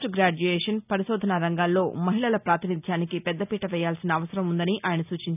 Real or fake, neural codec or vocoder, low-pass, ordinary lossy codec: real; none; 3.6 kHz; none